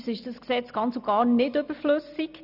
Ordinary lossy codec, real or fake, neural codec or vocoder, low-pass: none; real; none; 5.4 kHz